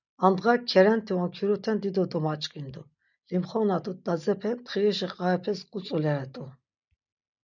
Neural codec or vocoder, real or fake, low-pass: none; real; 7.2 kHz